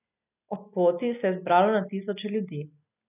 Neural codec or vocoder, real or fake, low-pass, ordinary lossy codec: none; real; 3.6 kHz; none